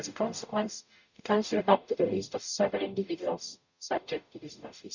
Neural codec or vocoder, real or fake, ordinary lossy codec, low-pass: codec, 44.1 kHz, 0.9 kbps, DAC; fake; none; 7.2 kHz